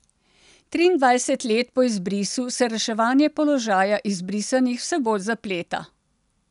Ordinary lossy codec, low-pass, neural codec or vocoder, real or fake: none; 10.8 kHz; none; real